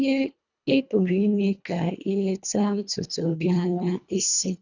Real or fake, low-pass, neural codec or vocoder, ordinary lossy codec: fake; 7.2 kHz; codec, 24 kHz, 1.5 kbps, HILCodec; none